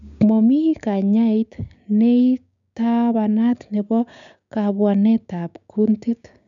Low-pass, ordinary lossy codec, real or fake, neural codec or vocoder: 7.2 kHz; none; fake; codec, 16 kHz, 6 kbps, DAC